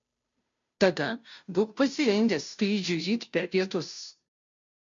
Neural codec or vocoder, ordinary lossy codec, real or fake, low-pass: codec, 16 kHz, 0.5 kbps, FunCodec, trained on Chinese and English, 25 frames a second; MP3, 64 kbps; fake; 7.2 kHz